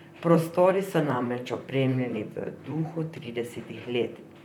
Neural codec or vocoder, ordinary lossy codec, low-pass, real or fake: vocoder, 44.1 kHz, 128 mel bands, Pupu-Vocoder; MP3, 96 kbps; 19.8 kHz; fake